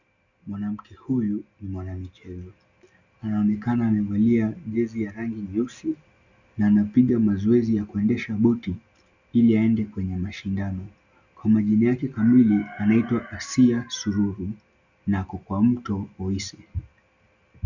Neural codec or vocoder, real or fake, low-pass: none; real; 7.2 kHz